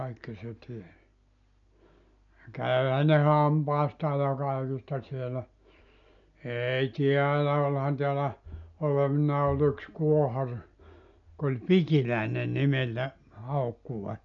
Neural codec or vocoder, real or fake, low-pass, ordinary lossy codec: none; real; 7.2 kHz; none